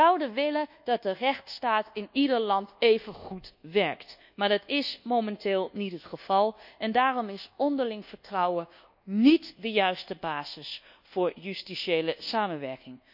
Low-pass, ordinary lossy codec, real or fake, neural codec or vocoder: 5.4 kHz; none; fake; codec, 24 kHz, 1.2 kbps, DualCodec